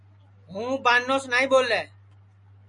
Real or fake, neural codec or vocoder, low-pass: real; none; 10.8 kHz